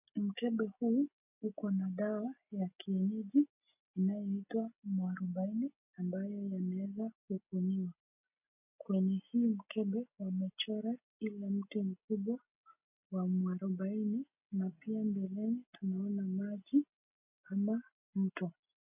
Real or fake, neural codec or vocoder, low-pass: real; none; 3.6 kHz